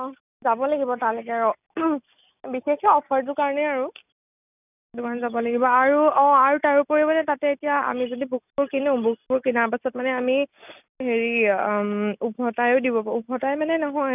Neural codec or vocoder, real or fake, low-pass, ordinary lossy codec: none; real; 3.6 kHz; none